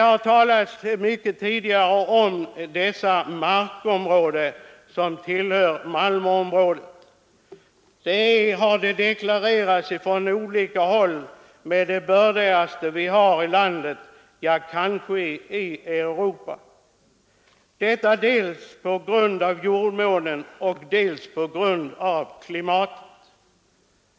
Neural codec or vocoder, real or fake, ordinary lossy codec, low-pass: none; real; none; none